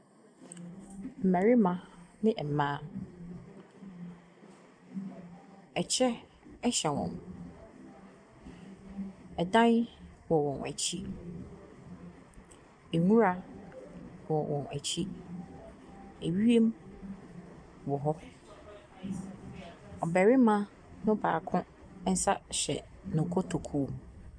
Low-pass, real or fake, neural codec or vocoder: 9.9 kHz; real; none